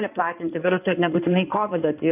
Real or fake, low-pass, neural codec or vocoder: fake; 3.6 kHz; codec, 16 kHz in and 24 kHz out, 2.2 kbps, FireRedTTS-2 codec